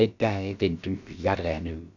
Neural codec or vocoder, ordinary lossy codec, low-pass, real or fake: codec, 16 kHz, about 1 kbps, DyCAST, with the encoder's durations; none; 7.2 kHz; fake